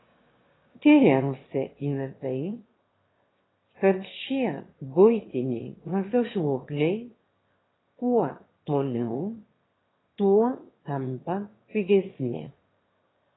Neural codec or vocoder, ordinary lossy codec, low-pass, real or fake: autoencoder, 22.05 kHz, a latent of 192 numbers a frame, VITS, trained on one speaker; AAC, 16 kbps; 7.2 kHz; fake